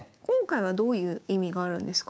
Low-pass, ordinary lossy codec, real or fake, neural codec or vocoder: none; none; fake; codec, 16 kHz, 6 kbps, DAC